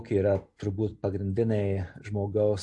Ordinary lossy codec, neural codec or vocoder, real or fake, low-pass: AAC, 64 kbps; none; real; 10.8 kHz